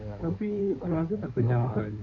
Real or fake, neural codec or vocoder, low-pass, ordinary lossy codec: fake; codec, 16 kHz in and 24 kHz out, 2.2 kbps, FireRedTTS-2 codec; 7.2 kHz; none